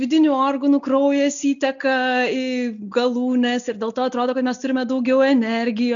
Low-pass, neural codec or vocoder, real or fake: 7.2 kHz; none; real